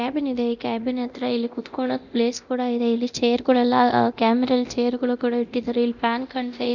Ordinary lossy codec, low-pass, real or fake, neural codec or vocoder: none; 7.2 kHz; fake; codec, 24 kHz, 0.9 kbps, DualCodec